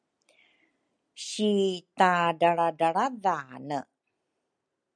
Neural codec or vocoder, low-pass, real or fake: none; 9.9 kHz; real